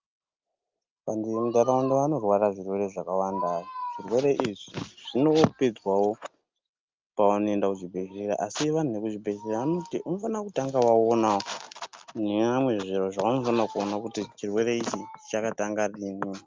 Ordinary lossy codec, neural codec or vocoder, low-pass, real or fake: Opus, 24 kbps; none; 7.2 kHz; real